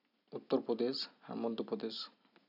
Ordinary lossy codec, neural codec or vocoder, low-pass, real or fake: none; none; 5.4 kHz; real